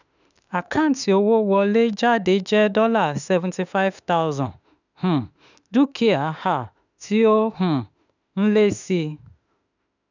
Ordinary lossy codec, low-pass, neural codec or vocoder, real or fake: none; 7.2 kHz; autoencoder, 48 kHz, 32 numbers a frame, DAC-VAE, trained on Japanese speech; fake